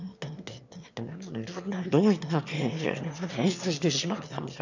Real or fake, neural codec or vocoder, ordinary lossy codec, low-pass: fake; autoencoder, 22.05 kHz, a latent of 192 numbers a frame, VITS, trained on one speaker; none; 7.2 kHz